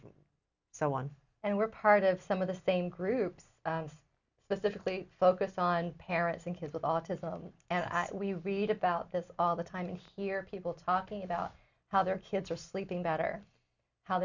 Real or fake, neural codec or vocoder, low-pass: real; none; 7.2 kHz